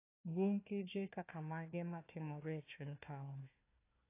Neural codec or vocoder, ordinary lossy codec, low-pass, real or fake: codec, 16 kHz, 1 kbps, FunCodec, trained on Chinese and English, 50 frames a second; AAC, 24 kbps; 3.6 kHz; fake